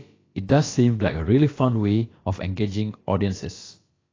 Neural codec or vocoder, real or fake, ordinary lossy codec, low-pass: codec, 16 kHz, about 1 kbps, DyCAST, with the encoder's durations; fake; AAC, 32 kbps; 7.2 kHz